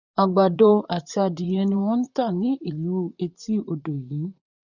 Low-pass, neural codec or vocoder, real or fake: 7.2 kHz; vocoder, 44.1 kHz, 128 mel bands, Pupu-Vocoder; fake